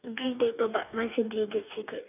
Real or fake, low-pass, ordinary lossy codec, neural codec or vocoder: fake; 3.6 kHz; none; codec, 44.1 kHz, 2.6 kbps, DAC